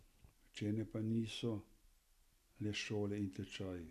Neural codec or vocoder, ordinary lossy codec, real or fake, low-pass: none; none; real; 14.4 kHz